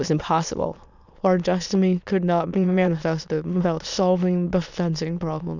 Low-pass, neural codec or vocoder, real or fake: 7.2 kHz; autoencoder, 22.05 kHz, a latent of 192 numbers a frame, VITS, trained on many speakers; fake